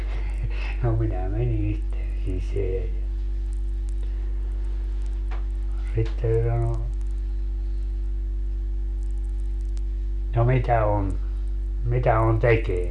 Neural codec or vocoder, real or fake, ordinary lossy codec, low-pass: none; real; none; 10.8 kHz